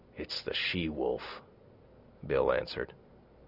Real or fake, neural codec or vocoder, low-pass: real; none; 5.4 kHz